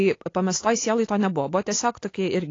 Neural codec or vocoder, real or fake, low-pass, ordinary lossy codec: none; real; 7.2 kHz; AAC, 32 kbps